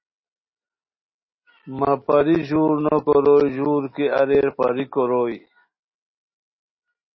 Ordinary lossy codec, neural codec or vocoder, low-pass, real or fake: MP3, 24 kbps; none; 7.2 kHz; real